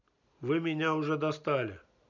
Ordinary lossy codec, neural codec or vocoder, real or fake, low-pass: none; none; real; 7.2 kHz